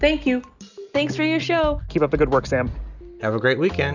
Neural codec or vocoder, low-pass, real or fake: none; 7.2 kHz; real